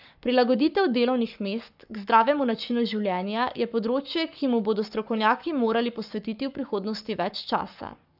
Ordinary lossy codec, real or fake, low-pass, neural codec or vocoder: none; fake; 5.4 kHz; codec, 44.1 kHz, 7.8 kbps, Pupu-Codec